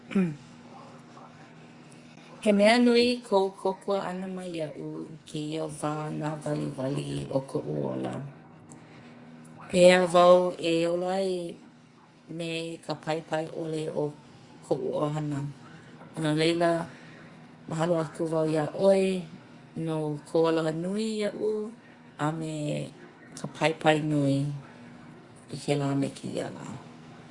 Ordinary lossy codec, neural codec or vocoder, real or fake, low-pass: Opus, 64 kbps; codec, 44.1 kHz, 2.6 kbps, SNAC; fake; 10.8 kHz